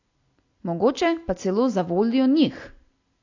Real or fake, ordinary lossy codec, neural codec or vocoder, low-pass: real; AAC, 48 kbps; none; 7.2 kHz